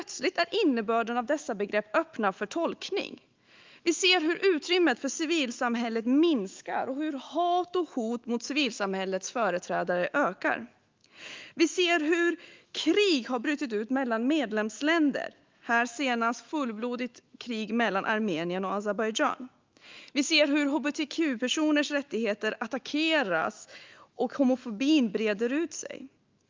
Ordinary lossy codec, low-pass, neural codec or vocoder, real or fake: Opus, 32 kbps; 7.2 kHz; autoencoder, 48 kHz, 128 numbers a frame, DAC-VAE, trained on Japanese speech; fake